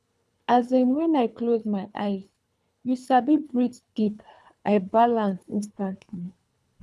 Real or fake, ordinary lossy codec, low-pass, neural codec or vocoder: fake; none; none; codec, 24 kHz, 3 kbps, HILCodec